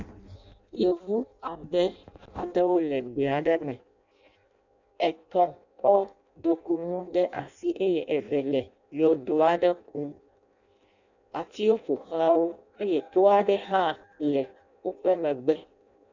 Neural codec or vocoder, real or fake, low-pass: codec, 16 kHz in and 24 kHz out, 0.6 kbps, FireRedTTS-2 codec; fake; 7.2 kHz